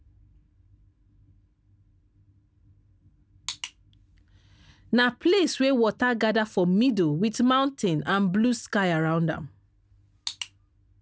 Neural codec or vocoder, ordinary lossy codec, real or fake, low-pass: none; none; real; none